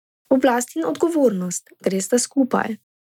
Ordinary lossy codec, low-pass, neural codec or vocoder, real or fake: none; 19.8 kHz; none; real